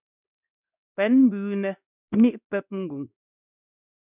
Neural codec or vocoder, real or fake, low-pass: codec, 16 kHz in and 24 kHz out, 1 kbps, XY-Tokenizer; fake; 3.6 kHz